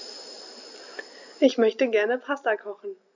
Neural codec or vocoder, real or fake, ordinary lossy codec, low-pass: none; real; none; 7.2 kHz